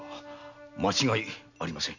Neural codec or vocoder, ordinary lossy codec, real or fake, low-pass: none; none; real; 7.2 kHz